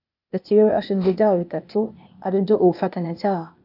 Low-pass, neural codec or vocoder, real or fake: 5.4 kHz; codec, 16 kHz, 0.8 kbps, ZipCodec; fake